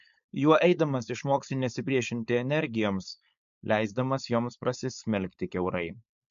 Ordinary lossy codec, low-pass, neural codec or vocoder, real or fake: MP3, 64 kbps; 7.2 kHz; codec, 16 kHz, 4.8 kbps, FACodec; fake